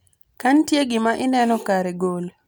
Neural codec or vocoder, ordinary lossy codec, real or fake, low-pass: none; none; real; none